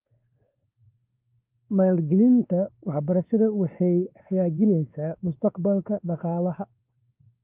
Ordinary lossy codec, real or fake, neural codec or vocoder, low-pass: Opus, 32 kbps; fake; codec, 16 kHz, 2 kbps, X-Codec, WavLM features, trained on Multilingual LibriSpeech; 3.6 kHz